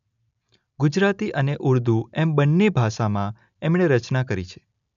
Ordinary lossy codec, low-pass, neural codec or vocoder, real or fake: none; 7.2 kHz; none; real